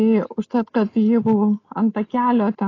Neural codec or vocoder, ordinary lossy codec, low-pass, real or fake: none; AAC, 32 kbps; 7.2 kHz; real